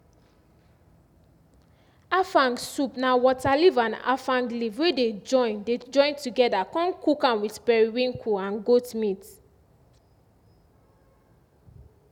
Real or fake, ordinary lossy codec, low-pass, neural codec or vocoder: real; none; 19.8 kHz; none